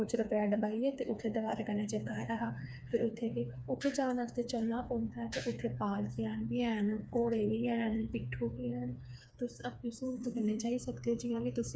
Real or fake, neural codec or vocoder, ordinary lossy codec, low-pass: fake; codec, 16 kHz, 4 kbps, FreqCodec, smaller model; none; none